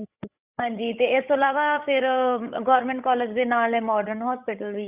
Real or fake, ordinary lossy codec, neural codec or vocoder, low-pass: fake; Opus, 64 kbps; codec, 16 kHz, 16 kbps, FreqCodec, larger model; 3.6 kHz